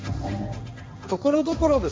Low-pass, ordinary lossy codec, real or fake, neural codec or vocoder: none; none; fake; codec, 16 kHz, 1.1 kbps, Voila-Tokenizer